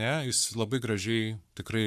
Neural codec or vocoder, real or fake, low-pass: codec, 44.1 kHz, 7.8 kbps, Pupu-Codec; fake; 14.4 kHz